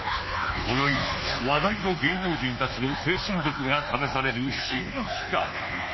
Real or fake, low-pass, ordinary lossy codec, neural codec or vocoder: fake; 7.2 kHz; MP3, 24 kbps; codec, 24 kHz, 1.2 kbps, DualCodec